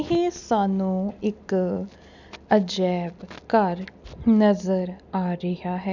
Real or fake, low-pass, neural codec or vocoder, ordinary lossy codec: real; 7.2 kHz; none; none